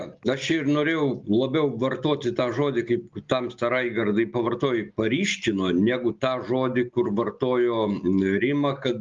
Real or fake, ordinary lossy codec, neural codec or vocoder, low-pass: real; Opus, 32 kbps; none; 7.2 kHz